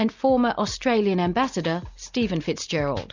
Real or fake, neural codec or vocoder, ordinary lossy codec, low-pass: real; none; Opus, 64 kbps; 7.2 kHz